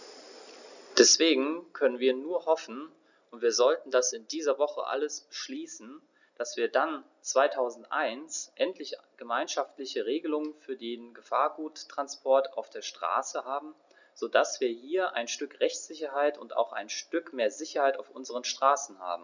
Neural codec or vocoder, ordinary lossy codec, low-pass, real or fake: none; none; 7.2 kHz; real